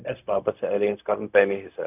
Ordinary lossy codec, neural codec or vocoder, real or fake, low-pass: none; codec, 16 kHz, 0.4 kbps, LongCat-Audio-Codec; fake; 3.6 kHz